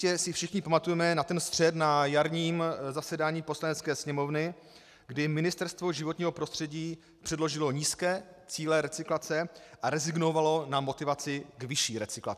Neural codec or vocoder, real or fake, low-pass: none; real; 14.4 kHz